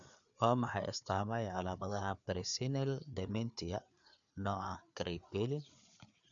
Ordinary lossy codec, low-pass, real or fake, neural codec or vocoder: none; 7.2 kHz; fake; codec, 16 kHz, 4 kbps, FreqCodec, larger model